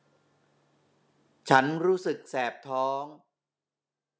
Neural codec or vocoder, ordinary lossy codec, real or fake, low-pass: none; none; real; none